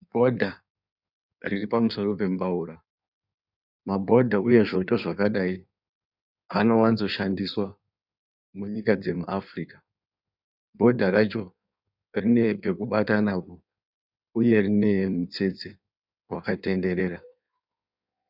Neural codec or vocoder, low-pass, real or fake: codec, 16 kHz in and 24 kHz out, 1.1 kbps, FireRedTTS-2 codec; 5.4 kHz; fake